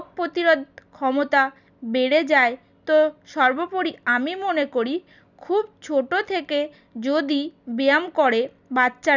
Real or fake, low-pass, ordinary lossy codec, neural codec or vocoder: real; 7.2 kHz; none; none